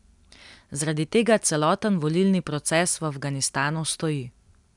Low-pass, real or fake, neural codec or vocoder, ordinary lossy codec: 10.8 kHz; real; none; none